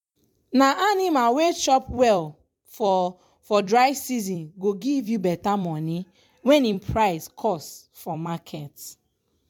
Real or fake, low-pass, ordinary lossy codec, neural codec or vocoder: fake; 19.8 kHz; MP3, 96 kbps; vocoder, 44.1 kHz, 128 mel bands every 256 samples, BigVGAN v2